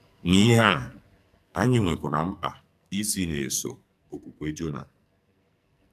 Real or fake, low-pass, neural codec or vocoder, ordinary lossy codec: fake; 14.4 kHz; codec, 44.1 kHz, 2.6 kbps, SNAC; none